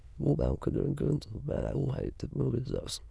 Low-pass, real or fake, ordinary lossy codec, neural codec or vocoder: none; fake; none; autoencoder, 22.05 kHz, a latent of 192 numbers a frame, VITS, trained on many speakers